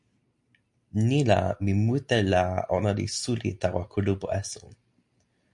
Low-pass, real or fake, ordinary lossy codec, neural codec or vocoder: 10.8 kHz; real; MP3, 64 kbps; none